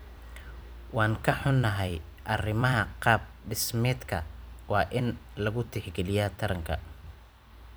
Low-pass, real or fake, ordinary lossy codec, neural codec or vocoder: none; fake; none; vocoder, 44.1 kHz, 128 mel bands every 256 samples, BigVGAN v2